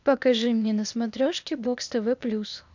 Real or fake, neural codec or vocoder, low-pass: fake; codec, 16 kHz, 0.8 kbps, ZipCodec; 7.2 kHz